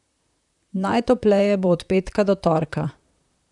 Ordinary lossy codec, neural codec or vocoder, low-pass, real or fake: none; vocoder, 44.1 kHz, 128 mel bands, Pupu-Vocoder; 10.8 kHz; fake